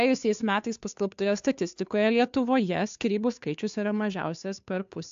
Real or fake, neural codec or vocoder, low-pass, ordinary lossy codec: fake; codec, 16 kHz, 2 kbps, FunCodec, trained on Chinese and English, 25 frames a second; 7.2 kHz; AAC, 64 kbps